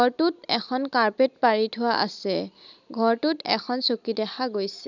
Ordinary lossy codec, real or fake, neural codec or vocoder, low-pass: none; real; none; 7.2 kHz